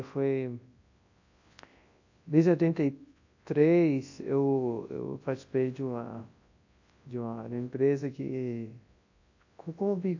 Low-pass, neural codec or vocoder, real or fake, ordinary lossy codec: 7.2 kHz; codec, 24 kHz, 0.9 kbps, WavTokenizer, large speech release; fake; AAC, 48 kbps